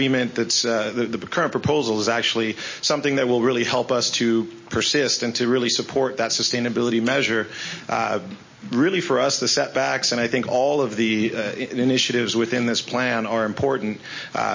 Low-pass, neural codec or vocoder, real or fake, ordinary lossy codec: 7.2 kHz; none; real; MP3, 32 kbps